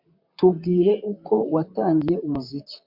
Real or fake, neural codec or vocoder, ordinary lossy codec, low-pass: fake; vocoder, 44.1 kHz, 128 mel bands, Pupu-Vocoder; MP3, 48 kbps; 5.4 kHz